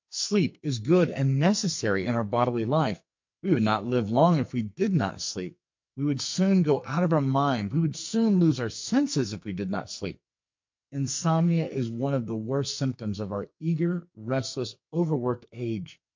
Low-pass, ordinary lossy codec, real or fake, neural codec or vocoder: 7.2 kHz; MP3, 48 kbps; fake; codec, 44.1 kHz, 2.6 kbps, SNAC